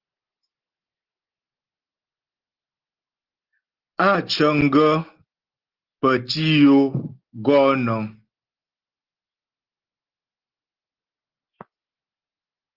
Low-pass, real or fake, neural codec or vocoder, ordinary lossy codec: 5.4 kHz; real; none; Opus, 16 kbps